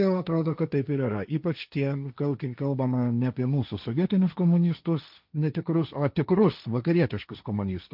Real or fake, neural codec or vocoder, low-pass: fake; codec, 16 kHz, 1.1 kbps, Voila-Tokenizer; 5.4 kHz